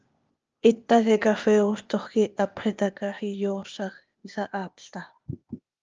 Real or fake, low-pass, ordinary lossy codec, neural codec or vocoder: fake; 7.2 kHz; Opus, 24 kbps; codec, 16 kHz, 0.8 kbps, ZipCodec